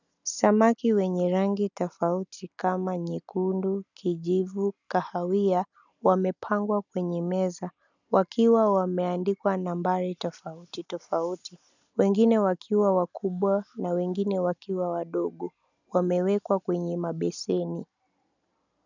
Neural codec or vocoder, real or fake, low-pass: none; real; 7.2 kHz